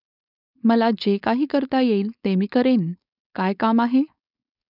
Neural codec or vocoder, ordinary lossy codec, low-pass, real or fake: codec, 16 kHz, 4.8 kbps, FACodec; none; 5.4 kHz; fake